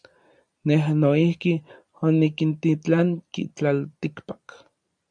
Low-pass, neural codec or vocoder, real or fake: 9.9 kHz; vocoder, 44.1 kHz, 128 mel bands every 256 samples, BigVGAN v2; fake